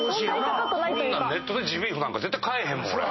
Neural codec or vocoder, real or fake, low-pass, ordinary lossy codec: none; real; 7.2 kHz; MP3, 24 kbps